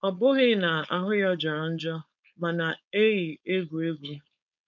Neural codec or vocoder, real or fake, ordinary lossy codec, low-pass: codec, 16 kHz, 4.8 kbps, FACodec; fake; none; 7.2 kHz